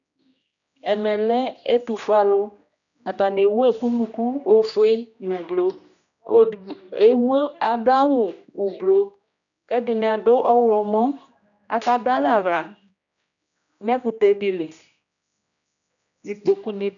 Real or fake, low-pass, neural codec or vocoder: fake; 7.2 kHz; codec, 16 kHz, 1 kbps, X-Codec, HuBERT features, trained on general audio